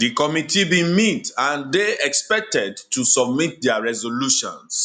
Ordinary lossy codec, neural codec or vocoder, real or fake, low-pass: none; none; real; 10.8 kHz